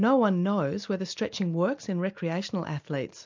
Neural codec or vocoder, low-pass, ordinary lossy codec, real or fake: none; 7.2 kHz; MP3, 64 kbps; real